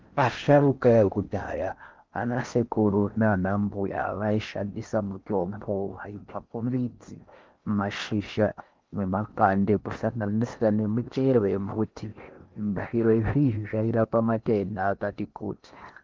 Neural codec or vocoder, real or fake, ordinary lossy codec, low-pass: codec, 16 kHz in and 24 kHz out, 0.6 kbps, FocalCodec, streaming, 4096 codes; fake; Opus, 32 kbps; 7.2 kHz